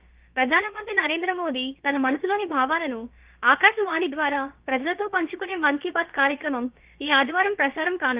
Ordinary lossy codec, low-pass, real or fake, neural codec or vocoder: Opus, 32 kbps; 3.6 kHz; fake; codec, 16 kHz, about 1 kbps, DyCAST, with the encoder's durations